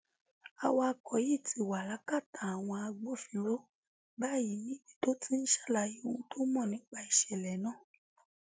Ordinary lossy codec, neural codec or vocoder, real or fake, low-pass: none; none; real; none